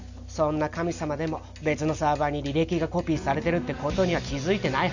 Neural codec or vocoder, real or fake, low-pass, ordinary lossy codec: none; real; 7.2 kHz; none